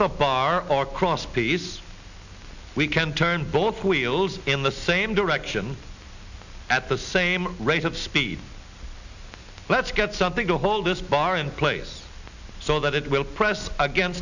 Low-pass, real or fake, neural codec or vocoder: 7.2 kHz; real; none